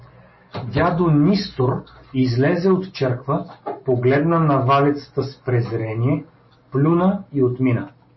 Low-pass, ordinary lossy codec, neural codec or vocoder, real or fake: 7.2 kHz; MP3, 24 kbps; none; real